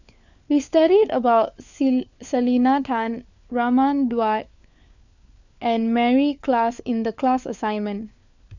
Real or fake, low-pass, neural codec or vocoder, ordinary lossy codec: fake; 7.2 kHz; codec, 16 kHz, 4 kbps, FunCodec, trained on LibriTTS, 50 frames a second; none